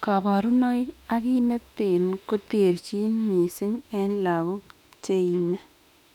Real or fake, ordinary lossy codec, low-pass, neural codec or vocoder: fake; none; 19.8 kHz; autoencoder, 48 kHz, 32 numbers a frame, DAC-VAE, trained on Japanese speech